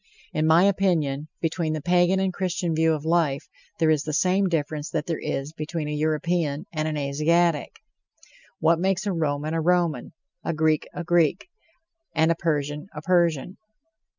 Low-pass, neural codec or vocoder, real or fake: 7.2 kHz; none; real